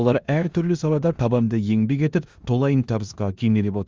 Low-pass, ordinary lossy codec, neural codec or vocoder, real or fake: 7.2 kHz; Opus, 32 kbps; codec, 16 kHz in and 24 kHz out, 0.9 kbps, LongCat-Audio-Codec, four codebook decoder; fake